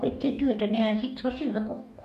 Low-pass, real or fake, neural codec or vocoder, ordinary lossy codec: 14.4 kHz; fake; codec, 44.1 kHz, 2.6 kbps, DAC; MP3, 96 kbps